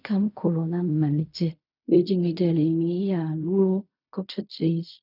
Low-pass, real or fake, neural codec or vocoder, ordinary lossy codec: 5.4 kHz; fake; codec, 16 kHz in and 24 kHz out, 0.4 kbps, LongCat-Audio-Codec, fine tuned four codebook decoder; none